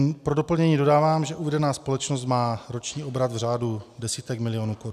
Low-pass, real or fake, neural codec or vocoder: 14.4 kHz; real; none